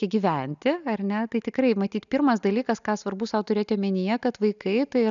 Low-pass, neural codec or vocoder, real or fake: 7.2 kHz; none; real